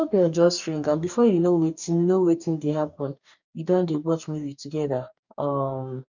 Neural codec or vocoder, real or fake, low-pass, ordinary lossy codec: codec, 44.1 kHz, 2.6 kbps, DAC; fake; 7.2 kHz; none